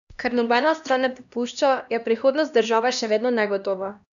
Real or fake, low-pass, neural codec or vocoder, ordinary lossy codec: fake; 7.2 kHz; codec, 16 kHz, 1 kbps, X-Codec, HuBERT features, trained on LibriSpeech; none